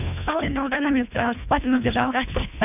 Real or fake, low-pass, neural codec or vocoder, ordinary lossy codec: fake; 3.6 kHz; codec, 24 kHz, 1.5 kbps, HILCodec; none